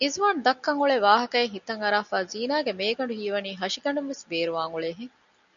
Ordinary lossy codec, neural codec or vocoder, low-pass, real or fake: MP3, 96 kbps; none; 7.2 kHz; real